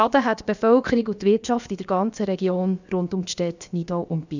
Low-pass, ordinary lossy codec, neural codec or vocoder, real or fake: 7.2 kHz; none; codec, 16 kHz, about 1 kbps, DyCAST, with the encoder's durations; fake